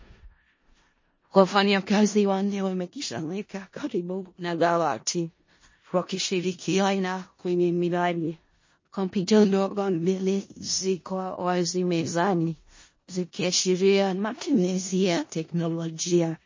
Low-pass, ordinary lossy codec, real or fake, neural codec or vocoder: 7.2 kHz; MP3, 32 kbps; fake; codec, 16 kHz in and 24 kHz out, 0.4 kbps, LongCat-Audio-Codec, four codebook decoder